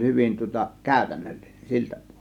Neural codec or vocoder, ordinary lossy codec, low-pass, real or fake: none; none; 19.8 kHz; real